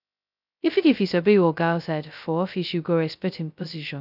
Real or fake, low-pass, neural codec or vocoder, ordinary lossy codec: fake; 5.4 kHz; codec, 16 kHz, 0.2 kbps, FocalCodec; none